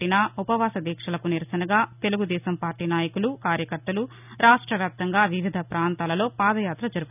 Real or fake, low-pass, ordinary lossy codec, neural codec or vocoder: real; 3.6 kHz; none; none